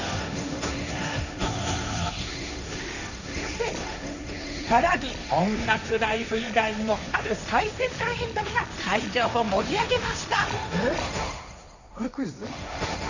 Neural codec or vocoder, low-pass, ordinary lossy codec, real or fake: codec, 16 kHz, 1.1 kbps, Voila-Tokenizer; 7.2 kHz; none; fake